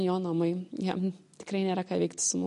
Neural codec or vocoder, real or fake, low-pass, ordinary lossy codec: none; real; 14.4 kHz; MP3, 48 kbps